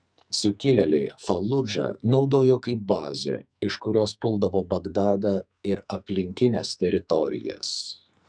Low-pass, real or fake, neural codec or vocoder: 9.9 kHz; fake; codec, 44.1 kHz, 2.6 kbps, SNAC